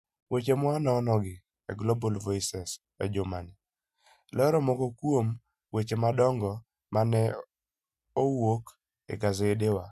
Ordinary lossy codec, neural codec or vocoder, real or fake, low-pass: none; none; real; 14.4 kHz